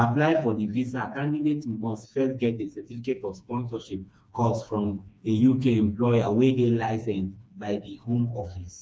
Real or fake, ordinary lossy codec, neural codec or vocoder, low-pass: fake; none; codec, 16 kHz, 2 kbps, FreqCodec, smaller model; none